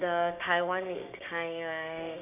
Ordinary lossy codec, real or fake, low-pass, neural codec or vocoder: none; fake; 3.6 kHz; codec, 16 kHz, 6 kbps, DAC